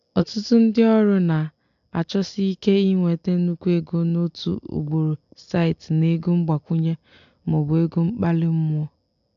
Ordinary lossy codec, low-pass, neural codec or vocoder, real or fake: AAC, 64 kbps; 7.2 kHz; none; real